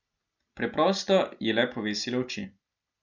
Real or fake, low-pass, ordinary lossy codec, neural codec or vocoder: real; 7.2 kHz; none; none